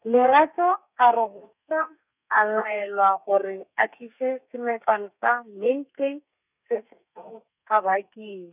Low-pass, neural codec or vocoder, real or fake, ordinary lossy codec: 3.6 kHz; codec, 32 kHz, 1.9 kbps, SNAC; fake; none